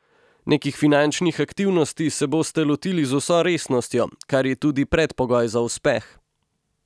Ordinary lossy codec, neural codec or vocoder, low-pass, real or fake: none; none; none; real